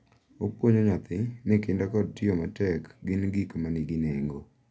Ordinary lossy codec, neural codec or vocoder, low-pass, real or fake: none; none; none; real